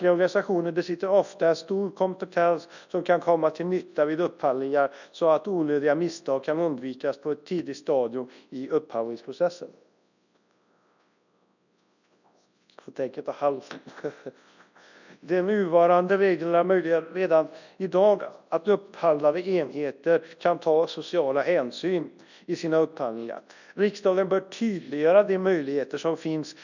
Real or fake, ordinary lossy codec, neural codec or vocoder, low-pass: fake; none; codec, 24 kHz, 0.9 kbps, WavTokenizer, large speech release; 7.2 kHz